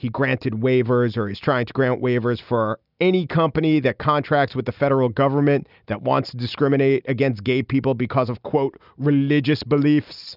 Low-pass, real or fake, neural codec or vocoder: 5.4 kHz; real; none